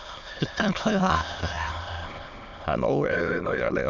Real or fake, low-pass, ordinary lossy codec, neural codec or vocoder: fake; 7.2 kHz; none; autoencoder, 22.05 kHz, a latent of 192 numbers a frame, VITS, trained on many speakers